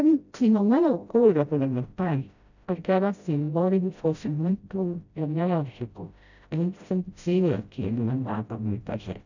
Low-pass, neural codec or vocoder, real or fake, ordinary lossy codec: 7.2 kHz; codec, 16 kHz, 0.5 kbps, FreqCodec, smaller model; fake; none